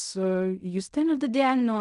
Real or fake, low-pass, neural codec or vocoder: fake; 10.8 kHz; codec, 16 kHz in and 24 kHz out, 0.4 kbps, LongCat-Audio-Codec, fine tuned four codebook decoder